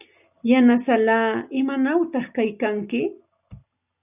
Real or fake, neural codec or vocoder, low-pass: real; none; 3.6 kHz